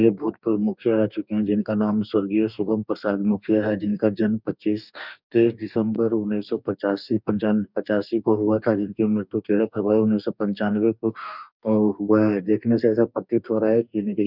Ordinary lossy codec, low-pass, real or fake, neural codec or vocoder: none; 5.4 kHz; fake; codec, 44.1 kHz, 2.6 kbps, DAC